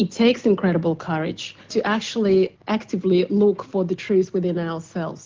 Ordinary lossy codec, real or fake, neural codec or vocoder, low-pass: Opus, 16 kbps; real; none; 7.2 kHz